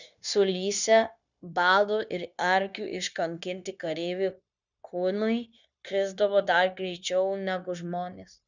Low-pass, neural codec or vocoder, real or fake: 7.2 kHz; codec, 16 kHz, 0.9 kbps, LongCat-Audio-Codec; fake